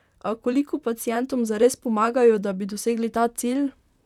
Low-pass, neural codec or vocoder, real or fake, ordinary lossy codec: 19.8 kHz; vocoder, 44.1 kHz, 128 mel bands, Pupu-Vocoder; fake; none